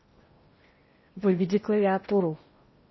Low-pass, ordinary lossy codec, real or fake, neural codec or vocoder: 7.2 kHz; MP3, 24 kbps; fake; codec, 16 kHz in and 24 kHz out, 0.8 kbps, FocalCodec, streaming, 65536 codes